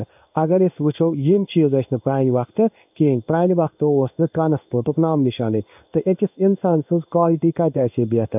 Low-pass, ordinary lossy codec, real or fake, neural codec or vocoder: 3.6 kHz; none; fake; codec, 16 kHz in and 24 kHz out, 1 kbps, XY-Tokenizer